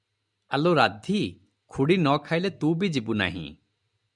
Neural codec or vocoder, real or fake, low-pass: none; real; 10.8 kHz